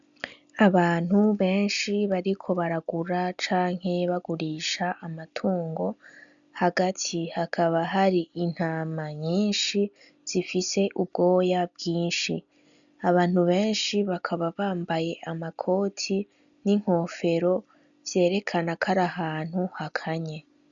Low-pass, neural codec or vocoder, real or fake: 7.2 kHz; none; real